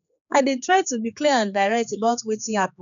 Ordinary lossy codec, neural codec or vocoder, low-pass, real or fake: none; codec, 16 kHz, 4 kbps, X-Codec, HuBERT features, trained on general audio; 7.2 kHz; fake